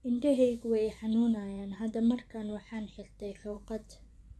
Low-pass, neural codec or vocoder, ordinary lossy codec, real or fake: none; vocoder, 24 kHz, 100 mel bands, Vocos; none; fake